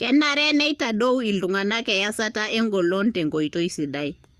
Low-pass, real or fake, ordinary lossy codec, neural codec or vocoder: 14.4 kHz; fake; Opus, 64 kbps; codec, 44.1 kHz, 7.8 kbps, DAC